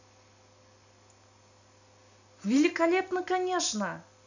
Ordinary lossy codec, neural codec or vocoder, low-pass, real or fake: none; none; 7.2 kHz; real